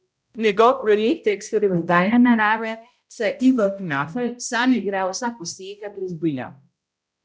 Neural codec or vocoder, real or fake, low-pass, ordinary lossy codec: codec, 16 kHz, 0.5 kbps, X-Codec, HuBERT features, trained on balanced general audio; fake; none; none